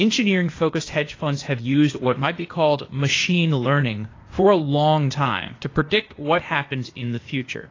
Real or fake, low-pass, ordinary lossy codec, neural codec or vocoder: fake; 7.2 kHz; AAC, 32 kbps; codec, 16 kHz, 0.8 kbps, ZipCodec